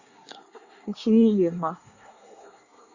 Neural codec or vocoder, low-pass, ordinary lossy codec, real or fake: codec, 24 kHz, 1 kbps, SNAC; 7.2 kHz; Opus, 64 kbps; fake